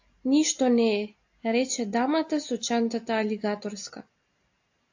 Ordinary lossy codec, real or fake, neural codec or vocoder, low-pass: AAC, 48 kbps; real; none; 7.2 kHz